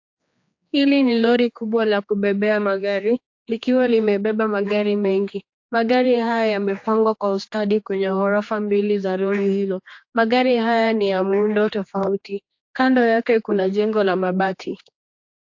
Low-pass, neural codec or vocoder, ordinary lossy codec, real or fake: 7.2 kHz; codec, 16 kHz, 2 kbps, X-Codec, HuBERT features, trained on general audio; AAC, 48 kbps; fake